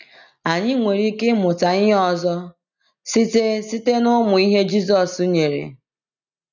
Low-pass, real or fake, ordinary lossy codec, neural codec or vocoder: 7.2 kHz; real; none; none